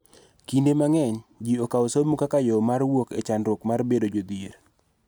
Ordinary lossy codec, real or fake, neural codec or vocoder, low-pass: none; real; none; none